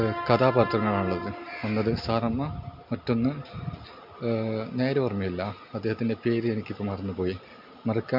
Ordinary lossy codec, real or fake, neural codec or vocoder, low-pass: MP3, 48 kbps; real; none; 5.4 kHz